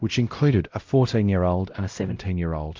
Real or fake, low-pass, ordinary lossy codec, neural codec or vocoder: fake; 7.2 kHz; Opus, 32 kbps; codec, 16 kHz, 0.5 kbps, X-Codec, WavLM features, trained on Multilingual LibriSpeech